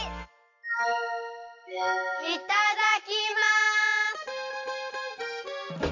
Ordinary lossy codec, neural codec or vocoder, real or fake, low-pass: AAC, 48 kbps; none; real; 7.2 kHz